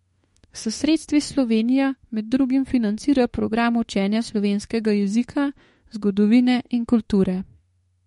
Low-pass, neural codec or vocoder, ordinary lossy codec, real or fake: 19.8 kHz; autoencoder, 48 kHz, 32 numbers a frame, DAC-VAE, trained on Japanese speech; MP3, 48 kbps; fake